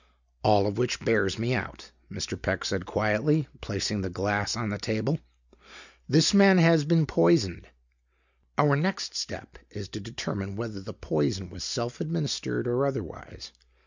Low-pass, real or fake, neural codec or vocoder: 7.2 kHz; real; none